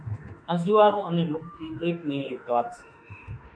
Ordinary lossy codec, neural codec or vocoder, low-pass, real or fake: MP3, 96 kbps; autoencoder, 48 kHz, 32 numbers a frame, DAC-VAE, trained on Japanese speech; 9.9 kHz; fake